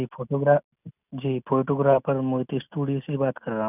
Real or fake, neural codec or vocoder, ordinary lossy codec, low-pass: real; none; none; 3.6 kHz